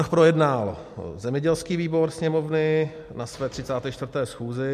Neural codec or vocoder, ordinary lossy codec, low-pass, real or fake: none; MP3, 64 kbps; 14.4 kHz; real